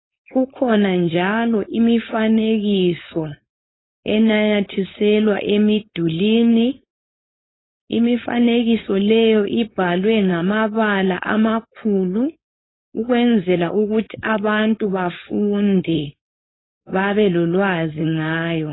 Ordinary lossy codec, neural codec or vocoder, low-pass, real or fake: AAC, 16 kbps; codec, 16 kHz, 4.8 kbps, FACodec; 7.2 kHz; fake